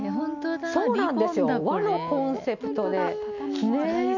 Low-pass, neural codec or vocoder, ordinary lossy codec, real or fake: 7.2 kHz; none; none; real